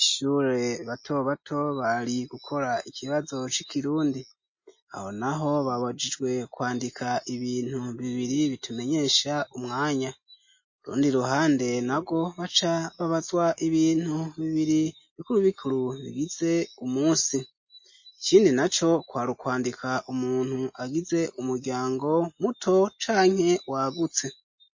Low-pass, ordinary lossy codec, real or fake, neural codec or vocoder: 7.2 kHz; MP3, 32 kbps; real; none